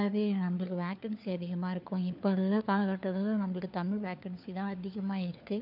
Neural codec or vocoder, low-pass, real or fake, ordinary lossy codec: codec, 16 kHz, 4 kbps, FunCodec, trained on LibriTTS, 50 frames a second; 5.4 kHz; fake; none